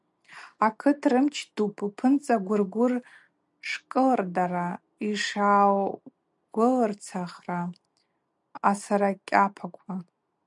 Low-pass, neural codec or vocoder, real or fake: 10.8 kHz; none; real